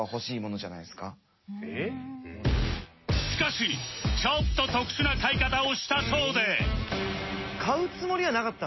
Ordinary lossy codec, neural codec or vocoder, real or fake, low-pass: MP3, 24 kbps; none; real; 7.2 kHz